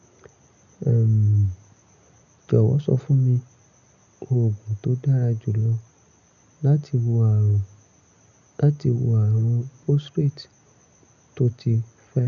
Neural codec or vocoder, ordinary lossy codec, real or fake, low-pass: none; none; real; 7.2 kHz